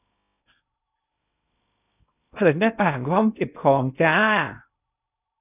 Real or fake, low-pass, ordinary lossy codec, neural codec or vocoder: fake; 3.6 kHz; none; codec, 16 kHz in and 24 kHz out, 0.6 kbps, FocalCodec, streaming, 2048 codes